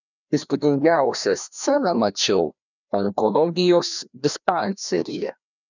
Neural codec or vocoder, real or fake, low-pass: codec, 16 kHz, 1 kbps, FreqCodec, larger model; fake; 7.2 kHz